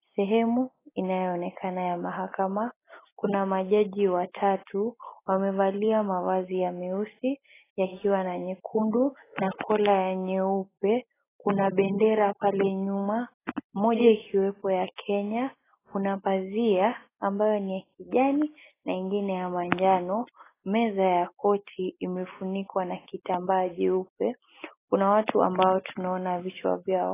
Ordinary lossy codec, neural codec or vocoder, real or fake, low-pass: AAC, 16 kbps; none; real; 3.6 kHz